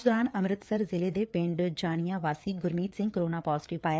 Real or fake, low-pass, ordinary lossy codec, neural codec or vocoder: fake; none; none; codec, 16 kHz, 4 kbps, FreqCodec, larger model